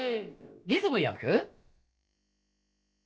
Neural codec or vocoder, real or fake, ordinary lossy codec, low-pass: codec, 16 kHz, about 1 kbps, DyCAST, with the encoder's durations; fake; none; none